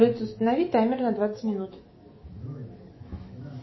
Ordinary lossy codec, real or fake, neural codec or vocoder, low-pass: MP3, 24 kbps; fake; autoencoder, 48 kHz, 128 numbers a frame, DAC-VAE, trained on Japanese speech; 7.2 kHz